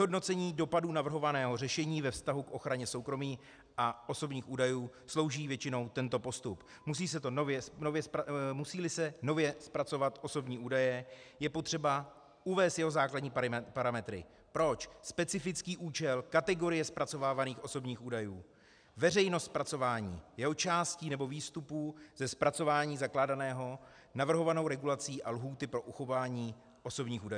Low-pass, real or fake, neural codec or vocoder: 9.9 kHz; real; none